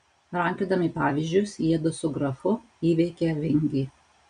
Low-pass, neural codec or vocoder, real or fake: 9.9 kHz; none; real